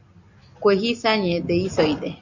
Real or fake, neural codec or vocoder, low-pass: real; none; 7.2 kHz